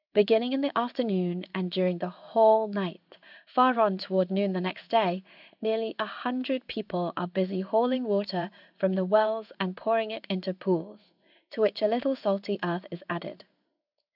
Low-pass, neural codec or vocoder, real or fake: 5.4 kHz; codec, 16 kHz in and 24 kHz out, 1 kbps, XY-Tokenizer; fake